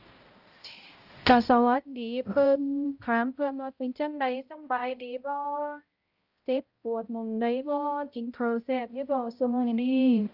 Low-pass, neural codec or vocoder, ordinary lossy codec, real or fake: 5.4 kHz; codec, 16 kHz, 0.5 kbps, X-Codec, HuBERT features, trained on balanced general audio; Opus, 24 kbps; fake